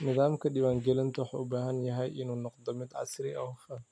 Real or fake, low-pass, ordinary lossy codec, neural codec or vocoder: real; none; none; none